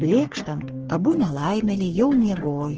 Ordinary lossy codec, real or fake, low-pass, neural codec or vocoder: Opus, 16 kbps; fake; 7.2 kHz; codec, 44.1 kHz, 3.4 kbps, Pupu-Codec